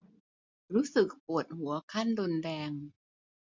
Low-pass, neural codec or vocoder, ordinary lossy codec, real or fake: 7.2 kHz; none; MP3, 64 kbps; real